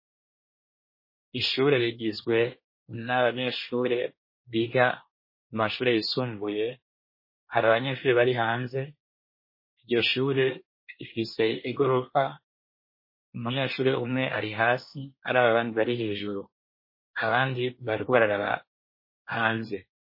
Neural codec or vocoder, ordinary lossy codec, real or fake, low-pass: codec, 24 kHz, 1 kbps, SNAC; MP3, 24 kbps; fake; 5.4 kHz